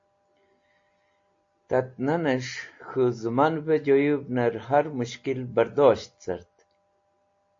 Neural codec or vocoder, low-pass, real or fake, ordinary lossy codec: none; 7.2 kHz; real; AAC, 48 kbps